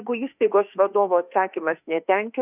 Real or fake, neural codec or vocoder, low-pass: fake; autoencoder, 48 kHz, 32 numbers a frame, DAC-VAE, trained on Japanese speech; 3.6 kHz